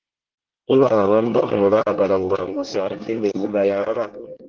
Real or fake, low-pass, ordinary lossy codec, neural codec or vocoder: fake; 7.2 kHz; Opus, 16 kbps; codec, 24 kHz, 1 kbps, SNAC